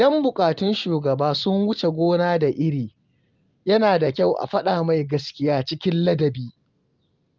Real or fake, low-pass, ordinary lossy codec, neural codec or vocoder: real; 7.2 kHz; Opus, 32 kbps; none